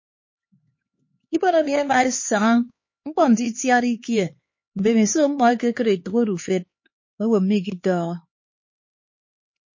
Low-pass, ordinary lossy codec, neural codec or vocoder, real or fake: 7.2 kHz; MP3, 32 kbps; codec, 16 kHz, 4 kbps, X-Codec, HuBERT features, trained on LibriSpeech; fake